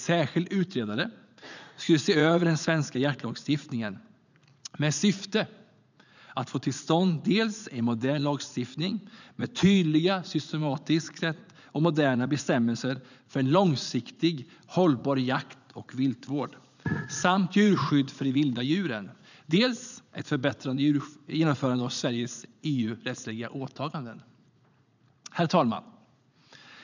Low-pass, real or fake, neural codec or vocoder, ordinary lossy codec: 7.2 kHz; fake; vocoder, 44.1 kHz, 80 mel bands, Vocos; none